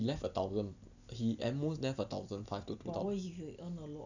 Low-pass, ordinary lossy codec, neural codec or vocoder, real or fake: 7.2 kHz; none; none; real